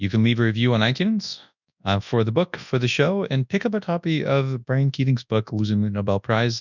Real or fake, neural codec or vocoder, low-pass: fake; codec, 24 kHz, 0.9 kbps, WavTokenizer, large speech release; 7.2 kHz